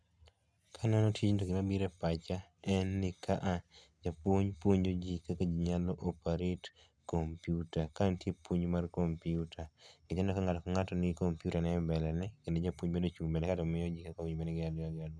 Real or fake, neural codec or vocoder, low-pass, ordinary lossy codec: real; none; none; none